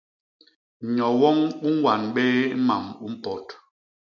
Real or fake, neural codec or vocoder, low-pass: real; none; 7.2 kHz